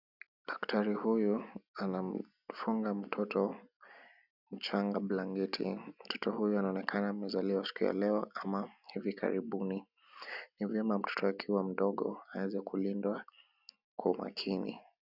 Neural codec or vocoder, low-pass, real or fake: none; 5.4 kHz; real